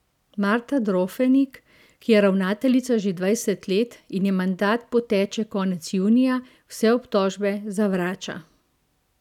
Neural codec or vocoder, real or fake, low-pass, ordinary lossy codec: none; real; 19.8 kHz; none